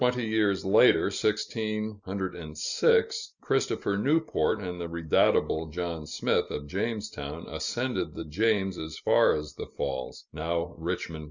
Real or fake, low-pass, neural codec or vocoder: real; 7.2 kHz; none